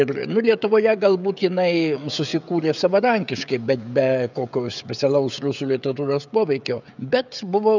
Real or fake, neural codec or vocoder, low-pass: fake; codec, 16 kHz, 16 kbps, FreqCodec, smaller model; 7.2 kHz